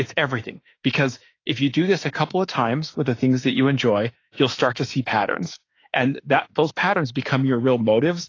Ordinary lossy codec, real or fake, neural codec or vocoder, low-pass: AAC, 32 kbps; fake; autoencoder, 48 kHz, 32 numbers a frame, DAC-VAE, trained on Japanese speech; 7.2 kHz